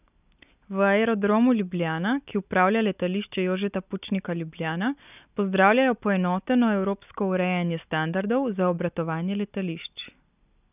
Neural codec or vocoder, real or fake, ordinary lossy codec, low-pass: none; real; none; 3.6 kHz